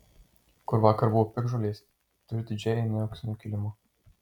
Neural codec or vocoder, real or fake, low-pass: none; real; 19.8 kHz